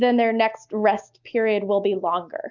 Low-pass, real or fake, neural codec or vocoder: 7.2 kHz; real; none